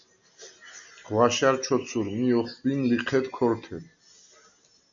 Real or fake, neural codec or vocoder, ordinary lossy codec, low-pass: real; none; MP3, 96 kbps; 7.2 kHz